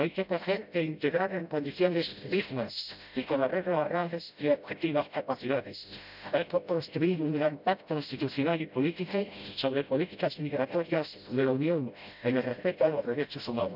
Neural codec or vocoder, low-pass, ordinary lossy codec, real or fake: codec, 16 kHz, 0.5 kbps, FreqCodec, smaller model; 5.4 kHz; none; fake